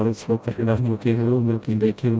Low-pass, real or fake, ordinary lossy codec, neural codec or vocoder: none; fake; none; codec, 16 kHz, 0.5 kbps, FreqCodec, smaller model